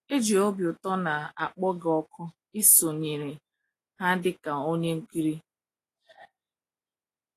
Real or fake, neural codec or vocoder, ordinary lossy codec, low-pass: real; none; AAC, 48 kbps; 14.4 kHz